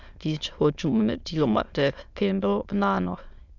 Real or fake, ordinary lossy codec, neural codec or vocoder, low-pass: fake; Opus, 64 kbps; autoencoder, 22.05 kHz, a latent of 192 numbers a frame, VITS, trained on many speakers; 7.2 kHz